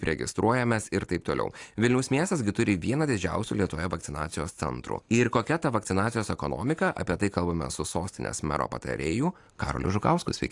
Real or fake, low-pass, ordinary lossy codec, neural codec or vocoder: real; 10.8 kHz; AAC, 64 kbps; none